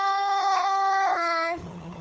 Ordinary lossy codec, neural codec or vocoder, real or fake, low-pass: none; codec, 16 kHz, 4 kbps, FunCodec, trained on LibriTTS, 50 frames a second; fake; none